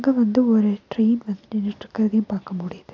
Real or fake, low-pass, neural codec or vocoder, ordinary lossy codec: real; 7.2 kHz; none; AAC, 48 kbps